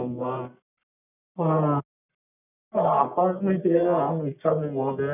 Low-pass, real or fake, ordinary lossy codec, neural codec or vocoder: 3.6 kHz; fake; none; codec, 44.1 kHz, 1.7 kbps, Pupu-Codec